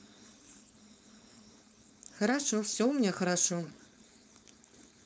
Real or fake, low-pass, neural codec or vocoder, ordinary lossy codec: fake; none; codec, 16 kHz, 4.8 kbps, FACodec; none